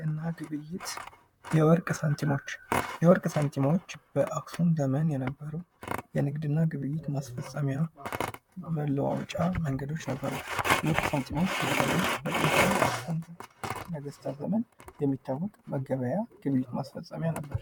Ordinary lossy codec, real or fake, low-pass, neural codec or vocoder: MP3, 96 kbps; fake; 19.8 kHz; vocoder, 44.1 kHz, 128 mel bands, Pupu-Vocoder